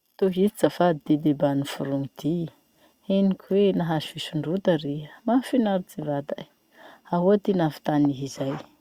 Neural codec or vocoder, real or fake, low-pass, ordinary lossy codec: none; real; 19.8 kHz; Opus, 64 kbps